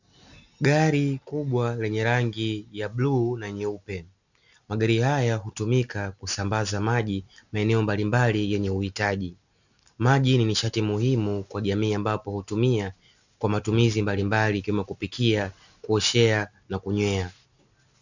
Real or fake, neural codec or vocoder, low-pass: real; none; 7.2 kHz